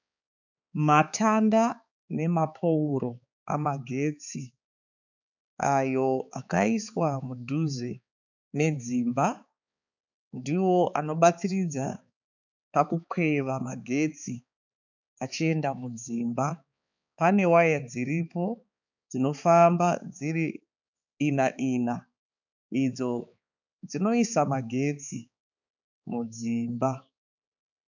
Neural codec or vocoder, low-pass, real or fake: codec, 16 kHz, 4 kbps, X-Codec, HuBERT features, trained on balanced general audio; 7.2 kHz; fake